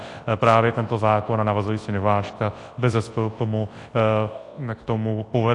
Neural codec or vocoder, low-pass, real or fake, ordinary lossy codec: codec, 24 kHz, 0.9 kbps, WavTokenizer, large speech release; 10.8 kHz; fake; AAC, 48 kbps